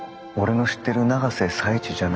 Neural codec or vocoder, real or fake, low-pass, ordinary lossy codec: none; real; none; none